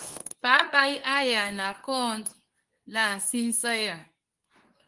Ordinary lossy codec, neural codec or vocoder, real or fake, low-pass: Opus, 32 kbps; codec, 24 kHz, 0.9 kbps, WavTokenizer, medium speech release version 2; fake; 10.8 kHz